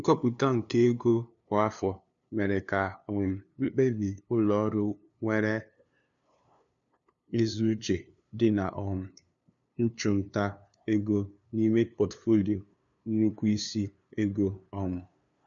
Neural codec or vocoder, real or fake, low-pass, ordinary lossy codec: codec, 16 kHz, 2 kbps, FunCodec, trained on LibriTTS, 25 frames a second; fake; 7.2 kHz; none